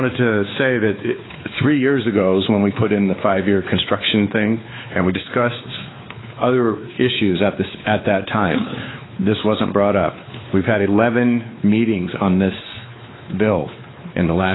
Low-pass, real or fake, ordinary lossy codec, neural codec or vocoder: 7.2 kHz; fake; AAC, 16 kbps; codec, 16 kHz, 4 kbps, X-Codec, WavLM features, trained on Multilingual LibriSpeech